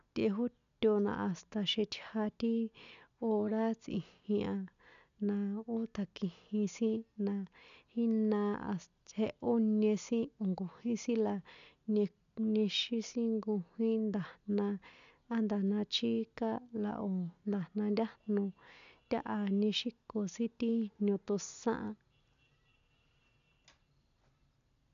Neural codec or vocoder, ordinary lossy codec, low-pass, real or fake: none; none; 7.2 kHz; real